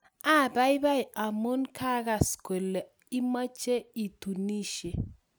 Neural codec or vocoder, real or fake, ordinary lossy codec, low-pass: none; real; none; none